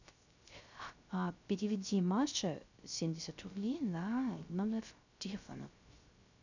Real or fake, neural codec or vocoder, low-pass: fake; codec, 16 kHz, 0.3 kbps, FocalCodec; 7.2 kHz